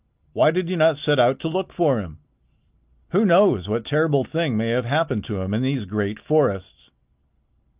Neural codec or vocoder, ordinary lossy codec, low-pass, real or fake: none; Opus, 24 kbps; 3.6 kHz; real